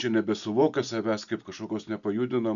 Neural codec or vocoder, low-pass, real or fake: none; 7.2 kHz; real